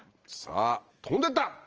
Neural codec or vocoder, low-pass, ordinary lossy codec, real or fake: none; 7.2 kHz; Opus, 24 kbps; real